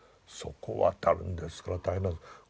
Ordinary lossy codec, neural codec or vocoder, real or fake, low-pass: none; none; real; none